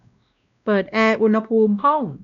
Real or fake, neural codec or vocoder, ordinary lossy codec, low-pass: fake; codec, 16 kHz, 1 kbps, X-Codec, WavLM features, trained on Multilingual LibriSpeech; none; 7.2 kHz